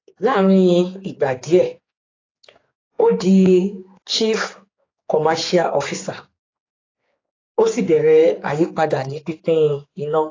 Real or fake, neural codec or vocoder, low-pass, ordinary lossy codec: fake; codec, 16 kHz, 4 kbps, X-Codec, HuBERT features, trained on general audio; 7.2 kHz; AAC, 32 kbps